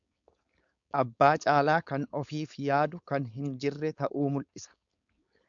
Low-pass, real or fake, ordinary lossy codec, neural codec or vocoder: 7.2 kHz; fake; MP3, 96 kbps; codec, 16 kHz, 4.8 kbps, FACodec